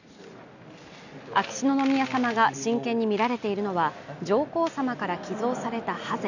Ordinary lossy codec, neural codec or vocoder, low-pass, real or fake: none; none; 7.2 kHz; real